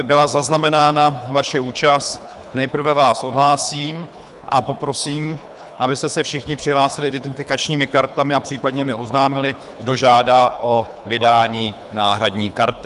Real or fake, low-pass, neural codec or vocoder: fake; 10.8 kHz; codec, 24 kHz, 3 kbps, HILCodec